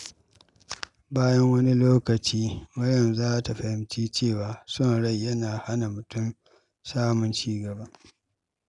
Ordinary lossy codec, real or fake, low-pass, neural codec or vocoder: none; real; 10.8 kHz; none